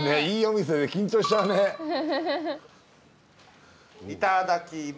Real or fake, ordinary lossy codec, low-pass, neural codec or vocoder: real; none; none; none